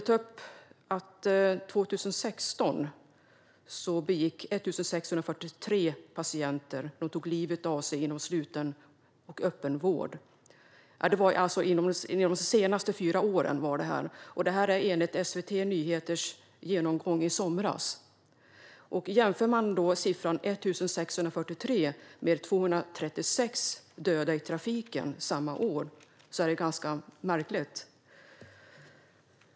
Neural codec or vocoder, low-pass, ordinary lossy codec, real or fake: none; none; none; real